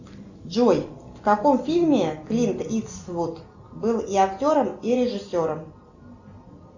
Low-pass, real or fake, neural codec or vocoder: 7.2 kHz; real; none